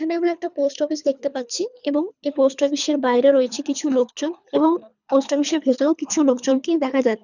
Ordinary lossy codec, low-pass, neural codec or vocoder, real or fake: none; 7.2 kHz; codec, 24 kHz, 3 kbps, HILCodec; fake